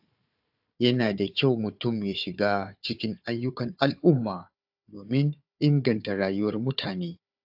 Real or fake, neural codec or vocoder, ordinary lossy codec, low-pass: fake; codec, 16 kHz, 4 kbps, FunCodec, trained on Chinese and English, 50 frames a second; AAC, 48 kbps; 5.4 kHz